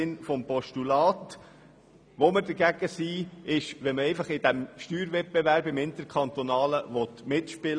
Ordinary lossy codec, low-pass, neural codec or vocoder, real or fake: none; 9.9 kHz; none; real